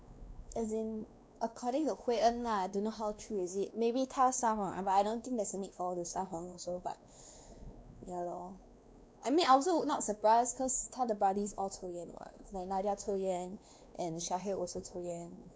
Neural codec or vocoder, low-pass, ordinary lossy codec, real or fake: codec, 16 kHz, 2 kbps, X-Codec, WavLM features, trained on Multilingual LibriSpeech; none; none; fake